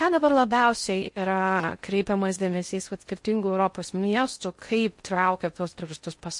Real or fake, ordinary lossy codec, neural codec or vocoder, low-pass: fake; MP3, 48 kbps; codec, 16 kHz in and 24 kHz out, 0.6 kbps, FocalCodec, streaming, 2048 codes; 10.8 kHz